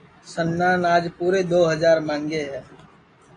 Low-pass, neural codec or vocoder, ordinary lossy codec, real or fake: 9.9 kHz; none; AAC, 32 kbps; real